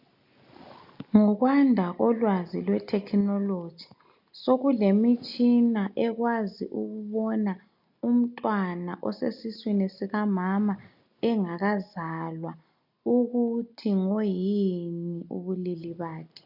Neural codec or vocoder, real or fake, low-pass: none; real; 5.4 kHz